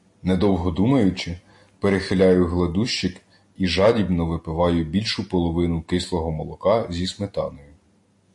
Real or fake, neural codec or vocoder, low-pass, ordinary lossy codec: real; none; 10.8 kHz; MP3, 48 kbps